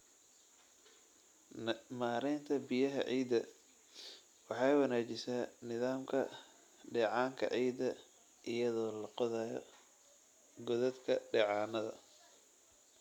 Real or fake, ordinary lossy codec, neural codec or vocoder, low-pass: real; none; none; 19.8 kHz